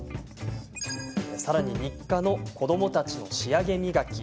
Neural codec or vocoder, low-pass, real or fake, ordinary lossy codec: none; none; real; none